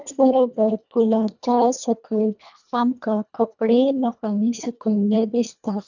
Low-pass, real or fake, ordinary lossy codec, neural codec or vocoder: 7.2 kHz; fake; none; codec, 24 kHz, 1.5 kbps, HILCodec